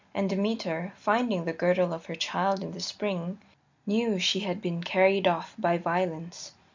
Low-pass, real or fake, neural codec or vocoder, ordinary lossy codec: 7.2 kHz; real; none; MP3, 64 kbps